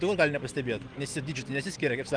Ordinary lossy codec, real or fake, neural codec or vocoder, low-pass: Opus, 24 kbps; real; none; 9.9 kHz